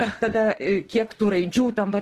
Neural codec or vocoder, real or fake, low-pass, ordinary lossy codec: autoencoder, 48 kHz, 32 numbers a frame, DAC-VAE, trained on Japanese speech; fake; 14.4 kHz; Opus, 16 kbps